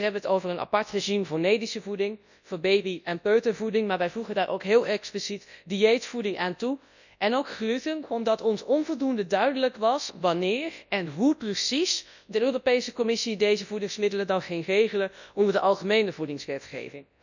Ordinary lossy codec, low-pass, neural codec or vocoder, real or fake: none; 7.2 kHz; codec, 24 kHz, 0.9 kbps, WavTokenizer, large speech release; fake